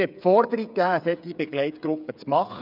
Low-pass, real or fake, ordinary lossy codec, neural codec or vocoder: 5.4 kHz; fake; none; codec, 16 kHz, 8 kbps, FreqCodec, smaller model